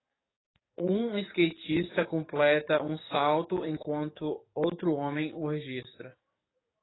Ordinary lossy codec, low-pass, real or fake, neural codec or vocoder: AAC, 16 kbps; 7.2 kHz; fake; codec, 44.1 kHz, 7.8 kbps, DAC